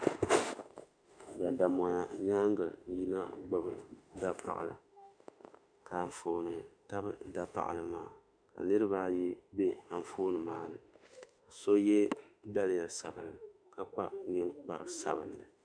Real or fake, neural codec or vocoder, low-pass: fake; autoencoder, 48 kHz, 32 numbers a frame, DAC-VAE, trained on Japanese speech; 9.9 kHz